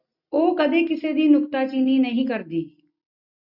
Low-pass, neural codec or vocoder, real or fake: 5.4 kHz; none; real